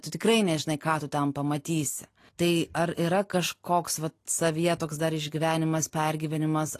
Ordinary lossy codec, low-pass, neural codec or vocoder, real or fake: AAC, 48 kbps; 14.4 kHz; none; real